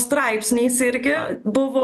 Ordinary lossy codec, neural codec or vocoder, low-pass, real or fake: MP3, 96 kbps; none; 14.4 kHz; real